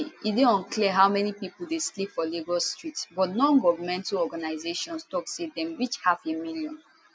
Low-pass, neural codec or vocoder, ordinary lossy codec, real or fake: none; none; none; real